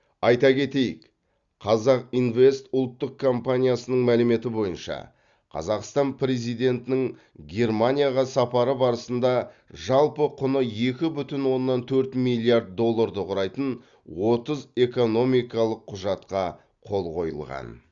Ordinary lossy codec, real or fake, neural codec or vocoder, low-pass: Opus, 64 kbps; real; none; 7.2 kHz